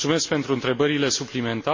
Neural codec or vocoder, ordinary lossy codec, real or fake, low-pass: none; MP3, 32 kbps; real; 7.2 kHz